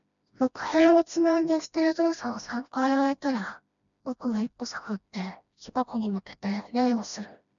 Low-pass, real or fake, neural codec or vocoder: 7.2 kHz; fake; codec, 16 kHz, 1 kbps, FreqCodec, smaller model